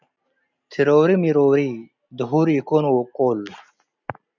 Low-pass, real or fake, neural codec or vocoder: 7.2 kHz; real; none